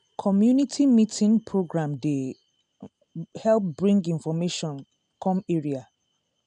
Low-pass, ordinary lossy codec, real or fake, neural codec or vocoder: 9.9 kHz; none; real; none